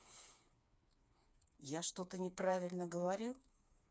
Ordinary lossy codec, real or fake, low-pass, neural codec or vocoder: none; fake; none; codec, 16 kHz, 4 kbps, FreqCodec, smaller model